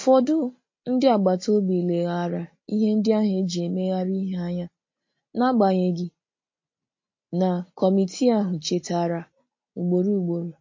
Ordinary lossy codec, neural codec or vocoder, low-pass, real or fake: MP3, 32 kbps; none; 7.2 kHz; real